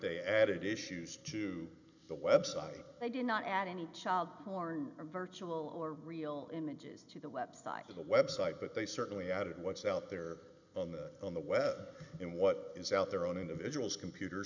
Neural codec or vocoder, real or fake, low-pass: none; real; 7.2 kHz